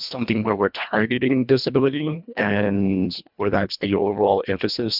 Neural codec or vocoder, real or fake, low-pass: codec, 24 kHz, 1.5 kbps, HILCodec; fake; 5.4 kHz